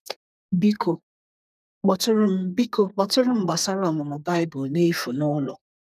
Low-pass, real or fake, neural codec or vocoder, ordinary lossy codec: 14.4 kHz; fake; codec, 32 kHz, 1.9 kbps, SNAC; none